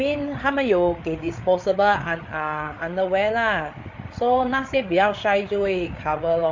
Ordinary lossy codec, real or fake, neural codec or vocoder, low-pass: MP3, 48 kbps; fake; codec, 16 kHz, 8 kbps, FreqCodec, larger model; 7.2 kHz